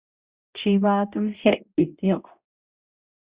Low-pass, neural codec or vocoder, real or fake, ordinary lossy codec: 3.6 kHz; codec, 16 kHz, 0.5 kbps, X-Codec, HuBERT features, trained on balanced general audio; fake; Opus, 64 kbps